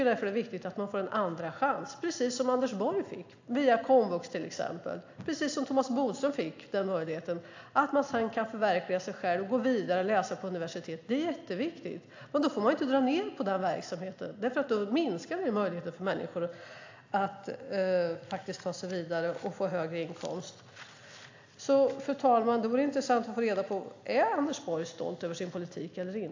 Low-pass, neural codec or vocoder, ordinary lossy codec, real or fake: 7.2 kHz; none; AAC, 48 kbps; real